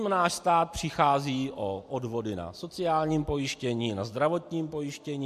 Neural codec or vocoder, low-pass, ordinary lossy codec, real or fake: none; 14.4 kHz; MP3, 64 kbps; real